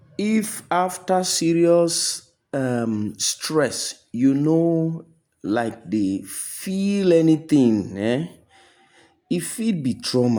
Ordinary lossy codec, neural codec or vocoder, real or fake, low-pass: none; none; real; none